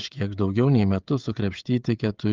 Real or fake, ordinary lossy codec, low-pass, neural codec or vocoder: fake; Opus, 32 kbps; 7.2 kHz; codec, 16 kHz, 16 kbps, FreqCodec, smaller model